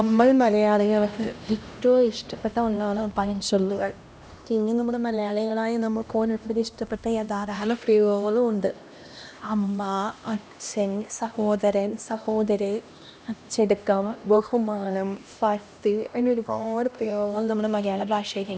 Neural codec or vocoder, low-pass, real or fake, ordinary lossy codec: codec, 16 kHz, 1 kbps, X-Codec, HuBERT features, trained on LibriSpeech; none; fake; none